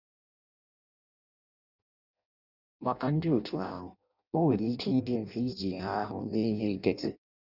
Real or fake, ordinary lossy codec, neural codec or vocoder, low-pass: fake; none; codec, 16 kHz in and 24 kHz out, 0.6 kbps, FireRedTTS-2 codec; 5.4 kHz